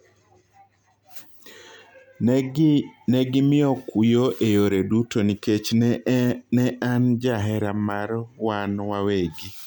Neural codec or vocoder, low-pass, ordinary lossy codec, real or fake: none; 19.8 kHz; MP3, 96 kbps; real